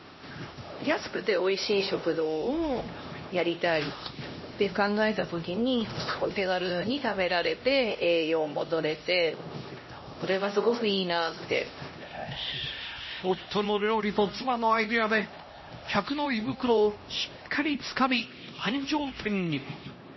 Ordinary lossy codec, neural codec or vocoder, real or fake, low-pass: MP3, 24 kbps; codec, 16 kHz, 1 kbps, X-Codec, HuBERT features, trained on LibriSpeech; fake; 7.2 kHz